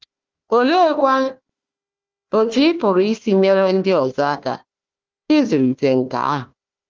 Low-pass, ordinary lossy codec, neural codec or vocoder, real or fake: 7.2 kHz; Opus, 24 kbps; codec, 16 kHz, 1 kbps, FunCodec, trained on Chinese and English, 50 frames a second; fake